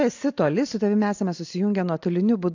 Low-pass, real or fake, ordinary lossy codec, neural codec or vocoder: 7.2 kHz; real; AAC, 48 kbps; none